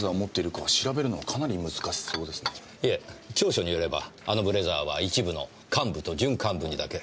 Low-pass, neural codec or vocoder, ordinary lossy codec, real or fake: none; none; none; real